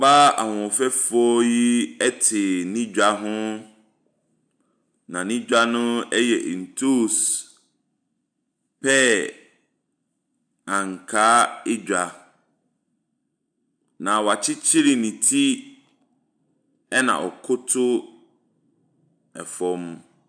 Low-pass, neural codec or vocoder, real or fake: 9.9 kHz; none; real